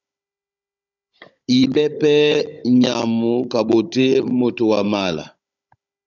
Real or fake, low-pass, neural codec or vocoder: fake; 7.2 kHz; codec, 16 kHz, 16 kbps, FunCodec, trained on Chinese and English, 50 frames a second